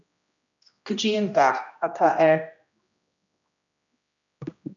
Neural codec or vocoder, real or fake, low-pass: codec, 16 kHz, 1 kbps, X-Codec, HuBERT features, trained on general audio; fake; 7.2 kHz